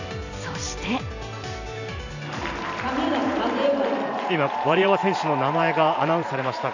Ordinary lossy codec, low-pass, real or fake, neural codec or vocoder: none; 7.2 kHz; fake; vocoder, 44.1 kHz, 128 mel bands every 512 samples, BigVGAN v2